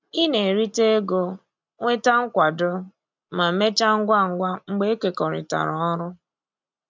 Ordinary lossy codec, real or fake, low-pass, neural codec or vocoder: MP3, 64 kbps; real; 7.2 kHz; none